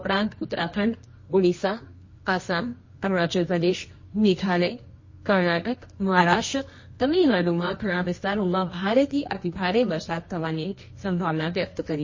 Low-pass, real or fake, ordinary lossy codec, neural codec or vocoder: 7.2 kHz; fake; MP3, 32 kbps; codec, 24 kHz, 0.9 kbps, WavTokenizer, medium music audio release